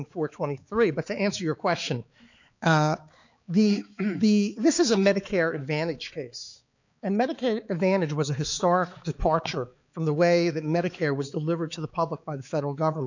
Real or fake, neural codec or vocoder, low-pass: fake; codec, 16 kHz, 4 kbps, X-Codec, HuBERT features, trained on balanced general audio; 7.2 kHz